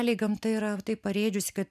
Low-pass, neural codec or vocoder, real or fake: 14.4 kHz; none; real